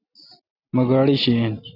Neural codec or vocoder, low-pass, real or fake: none; 5.4 kHz; real